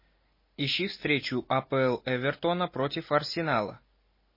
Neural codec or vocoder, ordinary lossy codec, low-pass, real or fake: none; MP3, 24 kbps; 5.4 kHz; real